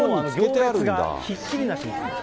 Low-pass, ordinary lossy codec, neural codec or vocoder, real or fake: none; none; none; real